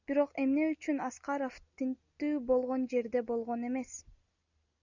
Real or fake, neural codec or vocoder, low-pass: real; none; 7.2 kHz